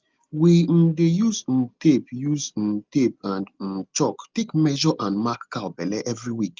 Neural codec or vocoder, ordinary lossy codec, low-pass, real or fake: none; Opus, 32 kbps; 7.2 kHz; real